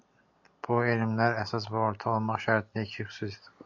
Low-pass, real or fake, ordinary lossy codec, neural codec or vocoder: 7.2 kHz; real; AAC, 48 kbps; none